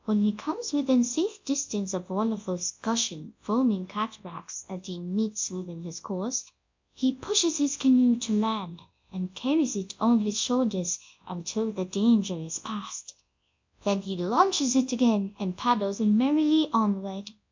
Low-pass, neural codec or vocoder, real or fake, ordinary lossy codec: 7.2 kHz; codec, 24 kHz, 0.9 kbps, WavTokenizer, large speech release; fake; MP3, 64 kbps